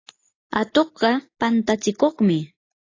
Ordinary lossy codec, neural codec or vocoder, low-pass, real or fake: AAC, 32 kbps; none; 7.2 kHz; real